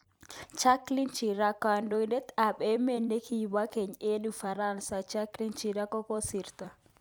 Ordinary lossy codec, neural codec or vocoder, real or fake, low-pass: none; vocoder, 44.1 kHz, 128 mel bands every 256 samples, BigVGAN v2; fake; none